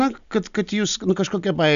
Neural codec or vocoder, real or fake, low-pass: none; real; 7.2 kHz